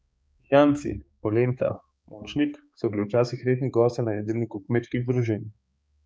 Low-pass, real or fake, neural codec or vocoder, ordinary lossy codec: none; fake; codec, 16 kHz, 4 kbps, X-Codec, HuBERT features, trained on balanced general audio; none